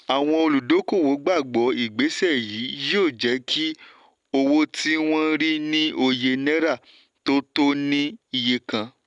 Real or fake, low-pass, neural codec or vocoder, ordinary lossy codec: real; 10.8 kHz; none; none